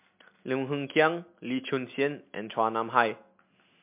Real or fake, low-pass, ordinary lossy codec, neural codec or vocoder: real; 3.6 kHz; MP3, 32 kbps; none